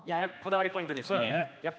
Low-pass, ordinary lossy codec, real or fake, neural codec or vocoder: none; none; fake; codec, 16 kHz, 2 kbps, X-Codec, HuBERT features, trained on general audio